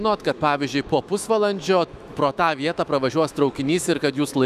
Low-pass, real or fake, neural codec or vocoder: 14.4 kHz; fake; autoencoder, 48 kHz, 128 numbers a frame, DAC-VAE, trained on Japanese speech